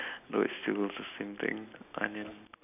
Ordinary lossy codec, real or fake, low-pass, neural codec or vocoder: none; real; 3.6 kHz; none